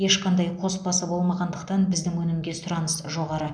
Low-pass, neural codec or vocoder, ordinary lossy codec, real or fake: 9.9 kHz; none; none; real